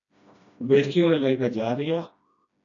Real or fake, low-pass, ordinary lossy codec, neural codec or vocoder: fake; 7.2 kHz; AAC, 48 kbps; codec, 16 kHz, 1 kbps, FreqCodec, smaller model